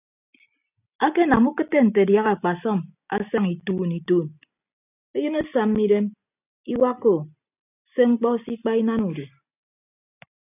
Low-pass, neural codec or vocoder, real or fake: 3.6 kHz; none; real